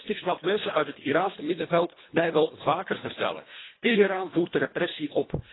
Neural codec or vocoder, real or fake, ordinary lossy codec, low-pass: codec, 24 kHz, 1.5 kbps, HILCodec; fake; AAC, 16 kbps; 7.2 kHz